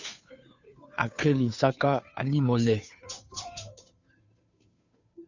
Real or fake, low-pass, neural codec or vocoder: fake; 7.2 kHz; codec, 16 kHz, 2 kbps, FunCodec, trained on Chinese and English, 25 frames a second